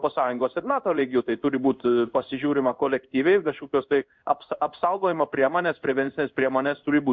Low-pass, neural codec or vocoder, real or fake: 7.2 kHz; codec, 16 kHz in and 24 kHz out, 1 kbps, XY-Tokenizer; fake